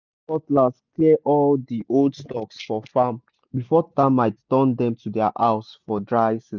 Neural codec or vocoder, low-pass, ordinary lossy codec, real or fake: none; 7.2 kHz; none; real